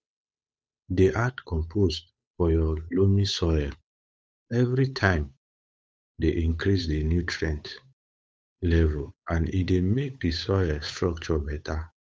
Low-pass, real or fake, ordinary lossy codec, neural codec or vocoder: none; fake; none; codec, 16 kHz, 8 kbps, FunCodec, trained on Chinese and English, 25 frames a second